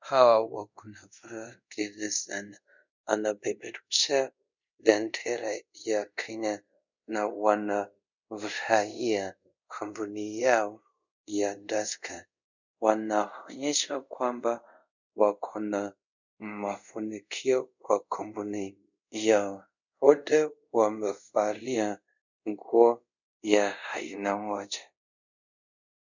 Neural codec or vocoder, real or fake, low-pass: codec, 24 kHz, 0.5 kbps, DualCodec; fake; 7.2 kHz